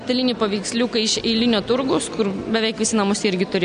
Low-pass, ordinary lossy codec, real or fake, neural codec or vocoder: 9.9 kHz; AAC, 96 kbps; real; none